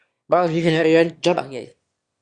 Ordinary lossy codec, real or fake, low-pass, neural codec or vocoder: Opus, 64 kbps; fake; 9.9 kHz; autoencoder, 22.05 kHz, a latent of 192 numbers a frame, VITS, trained on one speaker